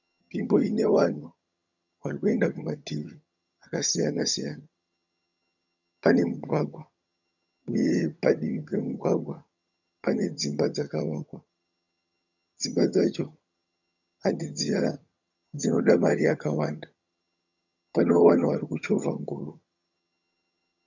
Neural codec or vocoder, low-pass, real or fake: vocoder, 22.05 kHz, 80 mel bands, HiFi-GAN; 7.2 kHz; fake